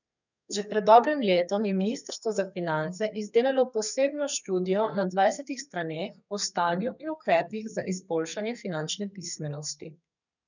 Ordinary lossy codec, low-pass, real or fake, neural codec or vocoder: none; 7.2 kHz; fake; codec, 32 kHz, 1.9 kbps, SNAC